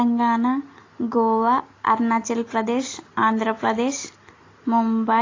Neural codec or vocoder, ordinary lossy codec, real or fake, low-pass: none; AAC, 32 kbps; real; 7.2 kHz